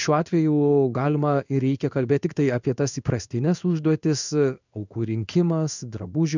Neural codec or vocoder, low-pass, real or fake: codec, 16 kHz in and 24 kHz out, 1 kbps, XY-Tokenizer; 7.2 kHz; fake